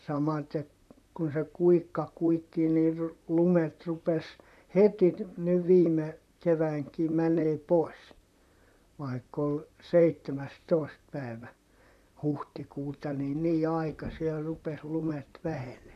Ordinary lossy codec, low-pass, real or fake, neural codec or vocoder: none; 14.4 kHz; fake; vocoder, 44.1 kHz, 128 mel bands, Pupu-Vocoder